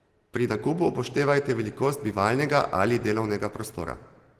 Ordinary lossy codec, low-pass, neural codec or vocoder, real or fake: Opus, 16 kbps; 14.4 kHz; none; real